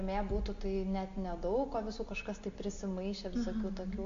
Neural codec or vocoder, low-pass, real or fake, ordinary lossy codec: none; 7.2 kHz; real; MP3, 48 kbps